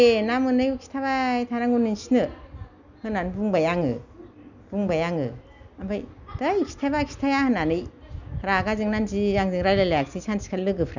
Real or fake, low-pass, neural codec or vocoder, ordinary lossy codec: real; 7.2 kHz; none; none